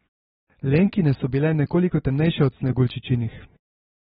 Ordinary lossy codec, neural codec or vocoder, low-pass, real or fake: AAC, 16 kbps; none; 19.8 kHz; real